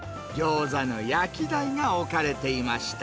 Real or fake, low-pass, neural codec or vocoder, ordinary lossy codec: real; none; none; none